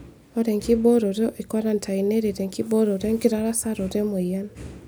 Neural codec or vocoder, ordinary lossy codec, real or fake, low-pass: none; none; real; none